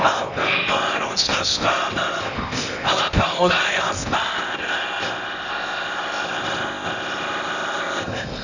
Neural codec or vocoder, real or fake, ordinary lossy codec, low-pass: codec, 16 kHz in and 24 kHz out, 0.8 kbps, FocalCodec, streaming, 65536 codes; fake; none; 7.2 kHz